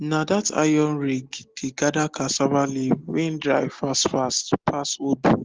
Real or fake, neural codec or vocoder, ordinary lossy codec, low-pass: real; none; Opus, 16 kbps; 7.2 kHz